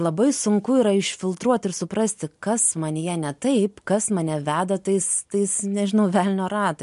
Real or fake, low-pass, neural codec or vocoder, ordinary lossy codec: real; 10.8 kHz; none; MP3, 64 kbps